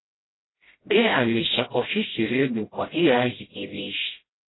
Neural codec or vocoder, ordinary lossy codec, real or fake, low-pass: codec, 16 kHz, 0.5 kbps, FreqCodec, smaller model; AAC, 16 kbps; fake; 7.2 kHz